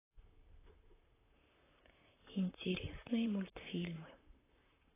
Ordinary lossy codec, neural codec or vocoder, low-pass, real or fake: AAC, 16 kbps; vocoder, 44.1 kHz, 128 mel bands, Pupu-Vocoder; 3.6 kHz; fake